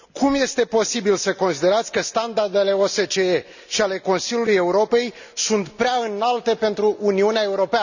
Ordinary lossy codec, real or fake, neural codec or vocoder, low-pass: none; real; none; 7.2 kHz